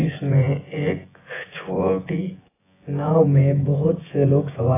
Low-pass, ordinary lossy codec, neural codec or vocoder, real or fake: 3.6 kHz; AAC, 16 kbps; vocoder, 24 kHz, 100 mel bands, Vocos; fake